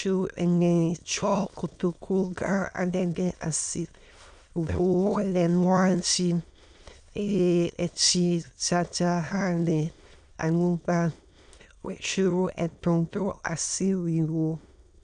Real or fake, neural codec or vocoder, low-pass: fake; autoencoder, 22.05 kHz, a latent of 192 numbers a frame, VITS, trained on many speakers; 9.9 kHz